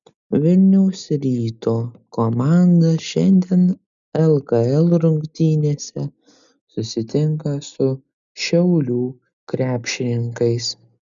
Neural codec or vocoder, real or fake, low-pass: none; real; 7.2 kHz